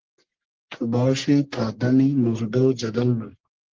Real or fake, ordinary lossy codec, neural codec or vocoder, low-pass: fake; Opus, 16 kbps; codec, 44.1 kHz, 1.7 kbps, Pupu-Codec; 7.2 kHz